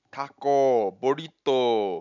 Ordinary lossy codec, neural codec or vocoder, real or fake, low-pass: none; none; real; 7.2 kHz